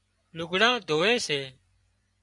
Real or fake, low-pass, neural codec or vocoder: fake; 10.8 kHz; vocoder, 24 kHz, 100 mel bands, Vocos